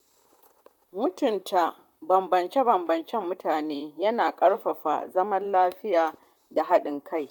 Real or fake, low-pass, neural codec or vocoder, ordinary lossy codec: fake; 19.8 kHz; vocoder, 44.1 kHz, 128 mel bands, Pupu-Vocoder; none